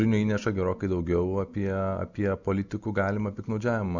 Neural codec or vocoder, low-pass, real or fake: none; 7.2 kHz; real